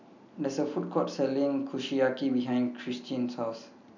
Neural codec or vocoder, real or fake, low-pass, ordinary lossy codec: none; real; 7.2 kHz; none